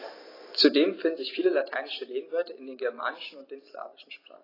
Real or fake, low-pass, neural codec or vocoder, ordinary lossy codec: fake; 5.4 kHz; vocoder, 44.1 kHz, 128 mel bands every 256 samples, BigVGAN v2; AAC, 24 kbps